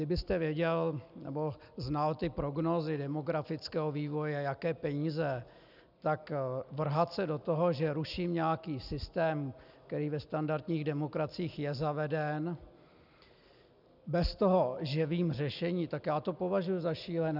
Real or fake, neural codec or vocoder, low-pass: real; none; 5.4 kHz